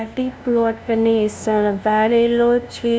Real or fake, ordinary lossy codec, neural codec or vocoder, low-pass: fake; none; codec, 16 kHz, 0.5 kbps, FunCodec, trained on LibriTTS, 25 frames a second; none